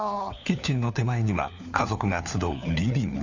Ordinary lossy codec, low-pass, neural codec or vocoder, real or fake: none; 7.2 kHz; codec, 16 kHz, 4 kbps, FunCodec, trained on LibriTTS, 50 frames a second; fake